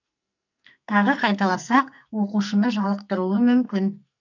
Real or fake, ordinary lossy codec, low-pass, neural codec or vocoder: fake; none; 7.2 kHz; codec, 44.1 kHz, 2.6 kbps, SNAC